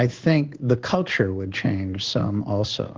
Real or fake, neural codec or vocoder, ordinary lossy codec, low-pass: fake; codec, 16 kHz in and 24 kHz out, 1 kbps, XY-Tokenizer; Opus, 16 kbps; 7.2 kHz